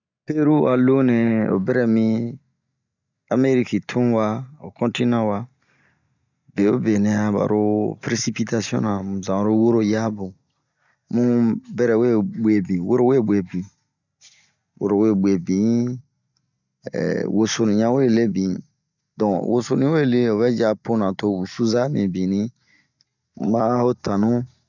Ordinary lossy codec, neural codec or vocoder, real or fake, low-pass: none; none; real; 7.2 kHz